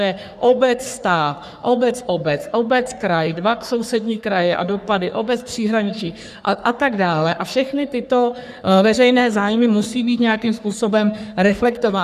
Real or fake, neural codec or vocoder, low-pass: fake; codec, 44.1 kHz, 3.4 kbps, Pupu-Codec; 14.4 kHz